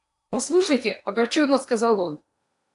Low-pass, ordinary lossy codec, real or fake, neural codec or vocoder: 10.8 kHz; AAC, 64 kbps; fake; codec, 16 kHz in and 24 kHz out, 0.8 kbps, FocalCodec, streaming, 65536 codes